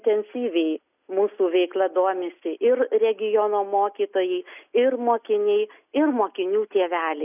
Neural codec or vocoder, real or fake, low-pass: none; real; 3.6 kHz